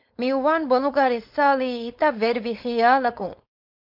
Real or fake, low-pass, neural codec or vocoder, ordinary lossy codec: fake; 5.4 kHz; codec, 16 kHz, 4.8 kbps, FACodec; MP3, 48 kbps